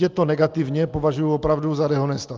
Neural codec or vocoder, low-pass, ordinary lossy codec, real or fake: none; 7.2 kHz; Opus, 32 kbps; real